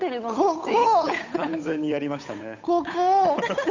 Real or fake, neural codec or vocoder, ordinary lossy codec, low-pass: fake; codec, 16 kHz, 8 kbps, FunCodec, trained on Chinese and English, 25 frames a second; none; 7.2 kHz